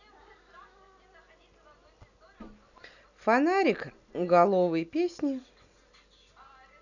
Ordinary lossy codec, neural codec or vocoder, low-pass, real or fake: none; none; 7.2 kHz; real